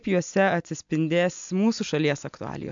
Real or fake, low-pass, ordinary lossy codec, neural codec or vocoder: real; 7.2 kHz; MP3, 64 kbps; none